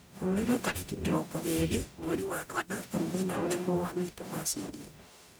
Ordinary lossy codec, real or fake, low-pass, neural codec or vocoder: none; fake; none; codec, 44.1 kHz, 0.9 kbps, DAC